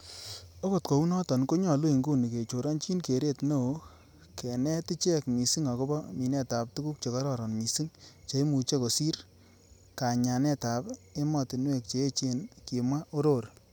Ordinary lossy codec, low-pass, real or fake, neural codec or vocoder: none; none; real; none